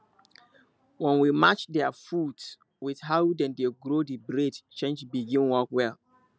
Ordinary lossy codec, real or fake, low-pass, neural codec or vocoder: none; real; none; none